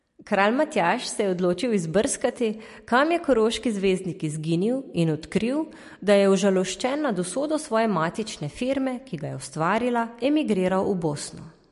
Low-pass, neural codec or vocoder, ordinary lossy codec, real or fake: 14.4 kHz; none; MP3, 48 kbps; real